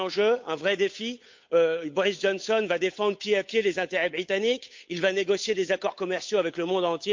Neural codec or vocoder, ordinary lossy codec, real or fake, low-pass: codec, 16 kHz, 8 kbps, FunCodec, trained on Chinese and English, 25 frames a second; MP3, 48 kbps; fake; 7.2 kHz